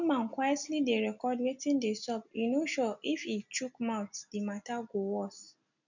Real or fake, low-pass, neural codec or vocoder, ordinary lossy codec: real; 7.2 kHz; none; none